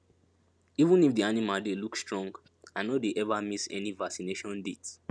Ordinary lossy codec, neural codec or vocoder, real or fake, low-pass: none; none; real; none